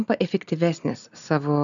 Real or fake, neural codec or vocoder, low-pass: real; none; 7.2 kHz